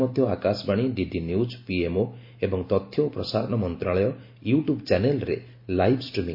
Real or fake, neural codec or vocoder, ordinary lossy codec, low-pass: real; none; MP3, 32 kbps; 5.4 kHz